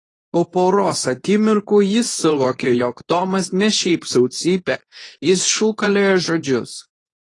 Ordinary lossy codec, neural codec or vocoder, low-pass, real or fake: AAC, 32 kbps; codec, 24 kHz, 0.9 kbps, WavTokenizer, medium speech release version 2; 10.8 kHz; fake